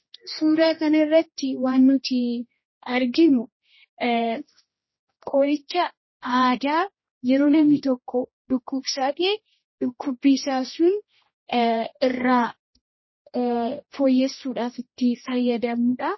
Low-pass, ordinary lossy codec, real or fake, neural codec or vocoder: 7.2 kHz; MP3, 24 kbps; fake; codec, 16 kHz, 1 kbps, X-Codec, HuBERT features, trained on general audio